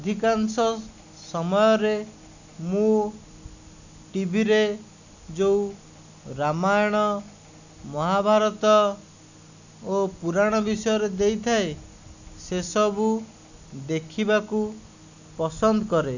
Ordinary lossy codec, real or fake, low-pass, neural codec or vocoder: none; real; 7.2 kHz; none